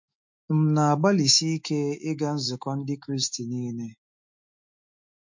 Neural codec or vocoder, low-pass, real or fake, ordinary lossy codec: autoencoder, 48 kHz, 128 numbers a frame, DAC-VAE, trained on Japanese speech; 7.2 kHz; fake; MP3, 48 kbps